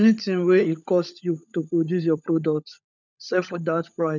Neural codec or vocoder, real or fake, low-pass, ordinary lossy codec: codec, 16 kHz, 16 kbps, FunCodec, trained on LibriTTS, 50 frames a second; fake; 7.2 kHz; none